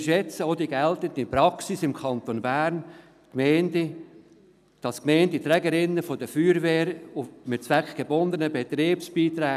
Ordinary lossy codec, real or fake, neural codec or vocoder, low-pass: none; real; none; 14.4 kHz